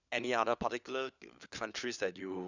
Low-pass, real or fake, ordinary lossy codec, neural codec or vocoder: 7.2 kHz; fake; none; codec, 16 kHz, 4 kbps, FunCodec, trained on LibriTTS, 50 frames a second